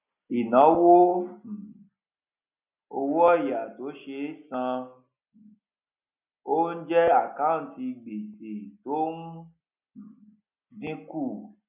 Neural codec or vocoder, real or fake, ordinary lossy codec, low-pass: none; real; none; 3.6 kHz